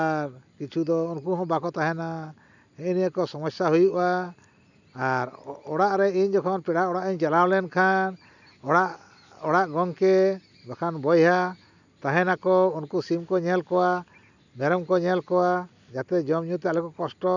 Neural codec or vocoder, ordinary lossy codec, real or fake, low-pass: none; none; real; 7.2 kHz